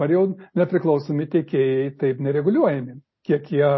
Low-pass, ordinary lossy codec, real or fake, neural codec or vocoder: 7.2 kHz; MP3, 24 kbps; real; none